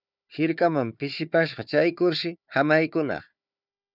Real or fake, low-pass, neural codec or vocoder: fake; 5.4 kHz; codec, 16 kHz, 4 kbps, FunCodec, trained on Chinese and English, 50 frames a second